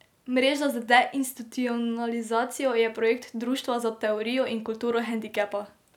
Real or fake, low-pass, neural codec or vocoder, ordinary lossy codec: real; 19.8 kHz; none; none